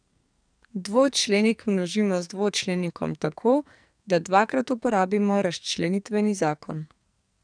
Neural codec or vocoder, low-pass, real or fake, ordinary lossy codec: codec, 44.1 kHz, 2.6 kbps, SNAC; 9.9 kHz; fake; none